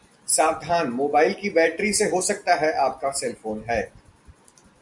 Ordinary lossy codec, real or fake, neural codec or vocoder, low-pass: Opus, 64 kbps; real; none; 10.8 kHz